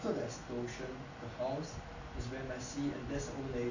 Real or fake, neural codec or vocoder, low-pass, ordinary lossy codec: real; none; 7.2 kHz; none